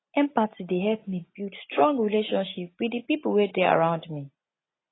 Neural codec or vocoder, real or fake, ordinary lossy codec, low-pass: none; real; AAC, 16 kbps; 7.2 kHz